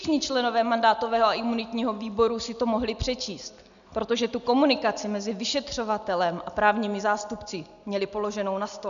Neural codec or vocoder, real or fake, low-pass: none; real; 7.2 kHz